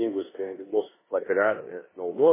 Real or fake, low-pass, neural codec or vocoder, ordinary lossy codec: fake; 3.6 kHz; codec, 16 kHz in and 24 kHz out, 0.9 kbps, LongCat-Audio-Codec, four codebook decoder; MP3, 16 kbps